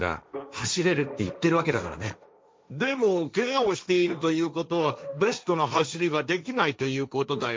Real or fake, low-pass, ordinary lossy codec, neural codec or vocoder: fake; none; none; codec, 16 kHz, 1.1 kbps, Voila-Tokenizer